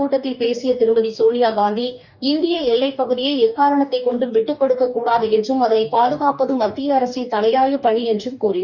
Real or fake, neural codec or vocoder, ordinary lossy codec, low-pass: fake; codec, 44.1 kHz, 2.6 kbps, DAC; none; 7.2 kHz